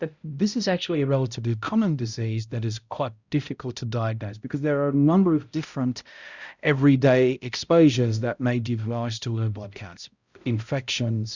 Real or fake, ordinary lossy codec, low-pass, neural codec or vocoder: fake; Opus, 64 kbps; 7.2 kHz; codec, 16 kHz, 0.5 kbps, X-Codec, HuBERT features, trained on balanced general audio